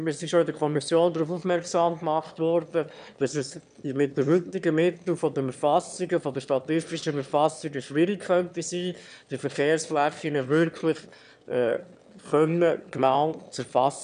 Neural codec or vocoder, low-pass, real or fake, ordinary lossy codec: autoencoder, 22.05 kHz, a latent of 192 numbers a frame, VITS, trained on one speaker; 9.9 kHz; fake; none